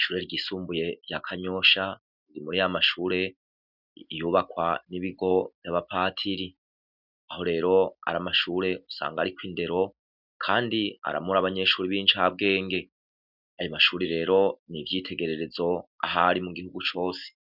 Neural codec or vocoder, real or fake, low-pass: none; real; 5.4 kHz